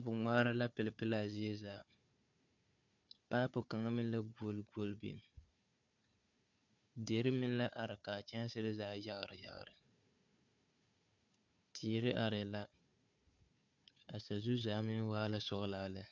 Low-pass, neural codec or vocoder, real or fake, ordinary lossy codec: 7.2 kHz; codec, 16 kHz, 4 kbps, FunCodec, trained on Chinese and English, 50 frames a second; fake; MP3, 64 kbps